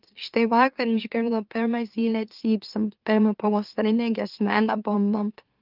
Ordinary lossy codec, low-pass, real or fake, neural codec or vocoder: Opus, 32 kbps; 5.4 kHz; fake; autoencoder, 44.1 kHz, a latent of 192 numbers a frame, MeloTTS